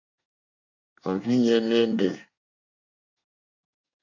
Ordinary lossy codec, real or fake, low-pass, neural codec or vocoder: MP3, 48 kbps; fake; 7.2 kHz; codec, 24 kHz, 1 kbps, SNAC